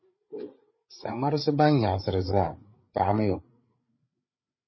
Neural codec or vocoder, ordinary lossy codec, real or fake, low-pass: codec, 16 kHz, 8 kbps, FreqCodec, larger model; MP3, 24 kbps; fake; 7.2 kHz